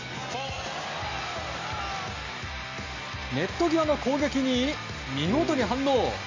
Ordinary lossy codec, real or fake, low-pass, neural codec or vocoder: MP3, 48 kbps; real; 7.2 kHz; none